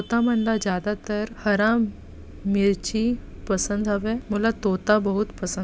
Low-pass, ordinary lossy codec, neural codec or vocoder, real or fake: none; none; none; real